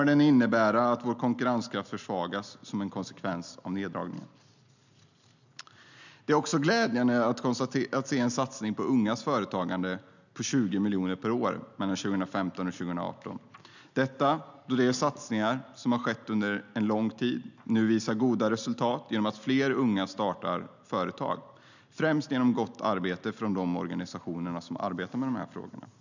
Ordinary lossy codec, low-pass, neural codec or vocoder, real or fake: none; 7.2 kHz; none; real